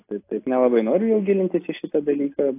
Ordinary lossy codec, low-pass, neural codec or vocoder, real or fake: AAC, 24 kbps; 3.6 kHz; none; real